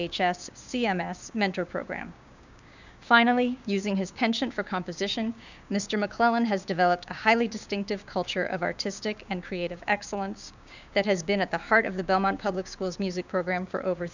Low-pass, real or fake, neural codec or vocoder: 7.2 kHz; fake; codec, 16 kHz, 6 kbps, DAC